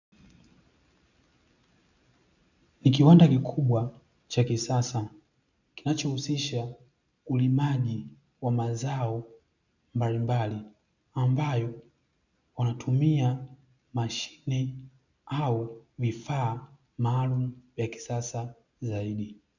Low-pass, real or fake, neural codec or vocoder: 7.2 kHz; real; none